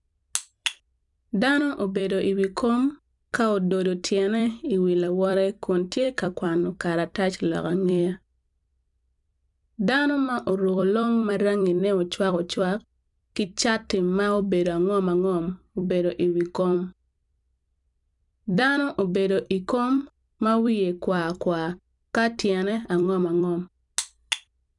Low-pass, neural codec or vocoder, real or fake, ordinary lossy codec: 10.8 kHz; vocoder, 44.1 kHz, 128 mel bands every 256 samples, BigVGAN v2; fake; none